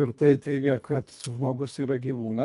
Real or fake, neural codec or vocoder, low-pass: fake; codec, 24 kHz, 1.5 kbps, HILCodec; 10.8 kHz